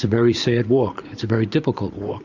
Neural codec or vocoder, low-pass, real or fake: vocoder, 44.1 kHz, 128 mel bands every 512 samples, BigVGAN v2; 7.2 kHz; fake